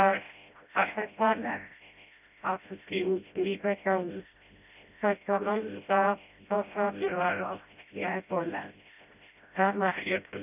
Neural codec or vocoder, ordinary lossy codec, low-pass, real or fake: codec, 16 kHz, 0.5 kbps, FreqCodec, smaller model; none; 3.6 kHz; fake